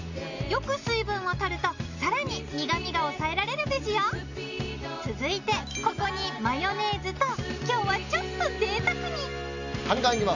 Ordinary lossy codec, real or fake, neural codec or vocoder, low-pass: none; real; none; 7.2 kHz